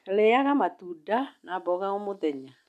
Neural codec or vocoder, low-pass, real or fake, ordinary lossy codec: none; 14.4 kHz; real; none